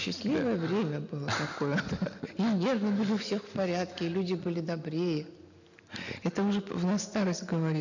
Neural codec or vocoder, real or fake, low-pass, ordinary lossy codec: none; real; 7.2 kHz; none